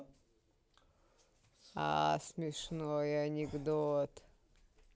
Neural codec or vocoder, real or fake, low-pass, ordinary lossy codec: none; real; none; none